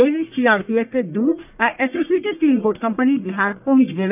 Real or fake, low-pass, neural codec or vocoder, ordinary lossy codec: fake; 3.6 kHz; codec, 44.1 kHz, 1.7 kbps, Pupu-Codec; none